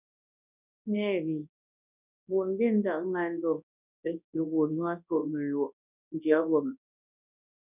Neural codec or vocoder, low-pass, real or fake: codec, 24 kHz, 0.9 kbps, WavTokenizer, large speech release; 3.6 kHz; fake